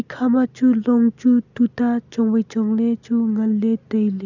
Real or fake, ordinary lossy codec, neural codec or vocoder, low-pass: real; none; none; 7.2 kHz